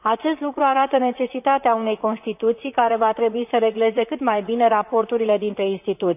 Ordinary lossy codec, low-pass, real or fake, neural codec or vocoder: none; 3.6 kHz; fake; vocoder, 44.1 kHz, 128 mel bands, Pupu-Vocoder